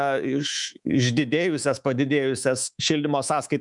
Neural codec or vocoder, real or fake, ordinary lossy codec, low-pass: codec, 24 kHz, 3.1 kbps, DualCodec; fake; MP3, 96 kbps; 10.8 kHz